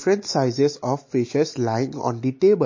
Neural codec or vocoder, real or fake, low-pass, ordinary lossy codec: none; real; 7.2 kHz; MP3, 32 kbps